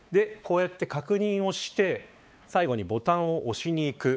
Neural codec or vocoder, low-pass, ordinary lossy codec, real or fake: codec, 16 kHz, 4 kbps, X-Codec, WavLM features, trained on Multilingual LibriSpeech; none; none; fake